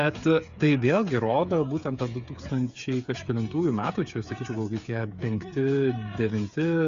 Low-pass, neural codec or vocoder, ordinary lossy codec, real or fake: 7.2 kHz; codec, 16 kHz, 8 kbps, FreqCodec, smaller model; Opus, 64 kbps; fake